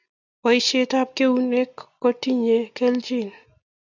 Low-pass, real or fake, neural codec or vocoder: 7.2 kHz; real; none